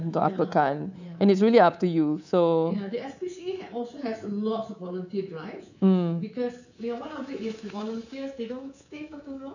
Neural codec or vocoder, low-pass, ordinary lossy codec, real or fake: codec, 24 kHz, 3.1 kbps, DualCodec; 7.2 kHz; none; fake